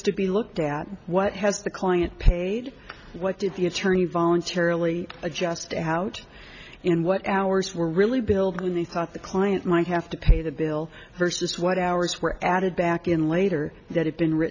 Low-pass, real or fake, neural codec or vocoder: 7.2 kHz; real; none